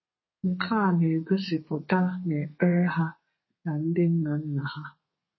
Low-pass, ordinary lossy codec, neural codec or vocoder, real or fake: 7.2 kHz; MP3, 24 kbps; codec, 32 kHz, 1.9 kbps, SNAC; fake